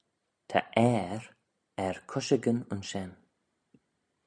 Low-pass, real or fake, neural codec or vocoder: 9.9 kHz; real; none